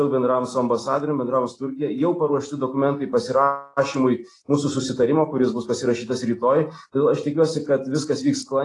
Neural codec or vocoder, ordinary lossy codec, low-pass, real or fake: none; AAC, 32 kbps; 10.8 kHz; real